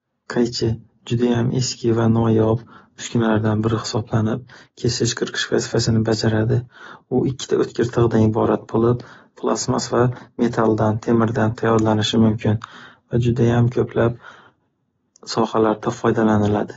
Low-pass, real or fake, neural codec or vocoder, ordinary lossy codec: 7.2 kHz; real; none; AAC, 24 kbps